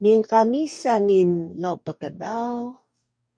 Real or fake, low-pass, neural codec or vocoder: fake; 9.9 kHz; codec, 44.1 kHz, 2.6 kbps, DAC